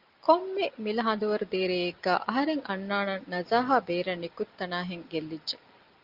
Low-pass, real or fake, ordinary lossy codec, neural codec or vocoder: 5.4 kHz; real; Opus, 32 kbps; none